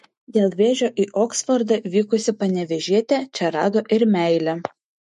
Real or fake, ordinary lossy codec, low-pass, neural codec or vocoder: fake; MP3, 48 kbps; 14.4 kHz; autoencoder, 48 kHz, 128 numbers a frame, DAC-VAE, trained on Japanese speech